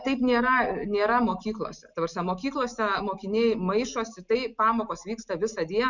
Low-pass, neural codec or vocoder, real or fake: 7.2 kHz; none; real